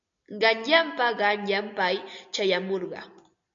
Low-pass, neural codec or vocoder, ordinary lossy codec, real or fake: 7.2 kHz; none; AAC, 64 kbps; real